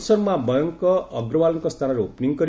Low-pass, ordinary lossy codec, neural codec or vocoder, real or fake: none; none; none; real